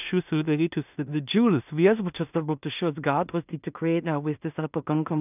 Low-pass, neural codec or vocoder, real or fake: 3.6 kHz; codec, 16 kHz in and 24 kHz out, 0.4 kbps, LongCat-Audio-Codec, two codebook decoder; fake